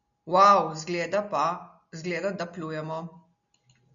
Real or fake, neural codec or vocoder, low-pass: real; none; 7.2 kHz